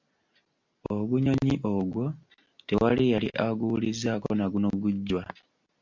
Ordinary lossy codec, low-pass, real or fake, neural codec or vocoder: MP3, 64 kbps; 7.2 kHz; real; none